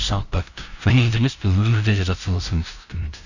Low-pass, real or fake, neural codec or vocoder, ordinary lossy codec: 7.2 kHz; fake; codec, 16 kHz, 0.5 kbps, FunCodec, trained on LibriTTS, 25 frames a second; none